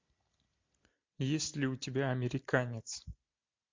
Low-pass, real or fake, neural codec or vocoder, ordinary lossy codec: 7.2 kHz; real; none; MP3, 48 kbps